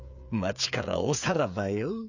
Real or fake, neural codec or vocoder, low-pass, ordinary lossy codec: fake; codec, 16 kHz, 8 kbps, FreqCodec, smaller model; 7.2 kHz; none